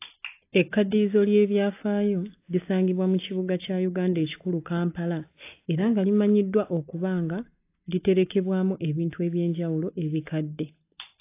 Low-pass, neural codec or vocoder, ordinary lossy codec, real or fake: 3.6 kHz; none; AAC, 24 kbps; real